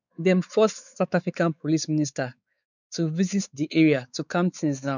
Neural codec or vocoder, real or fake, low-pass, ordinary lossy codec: codec, 16 kHz, 4 kbps, X-Codec, WavLM features, trained on Multilingual LibriSpeech; fake; 7.2 kHz; none